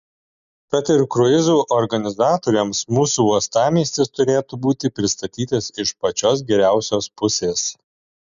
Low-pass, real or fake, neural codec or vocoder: 7.2 kHz; real; none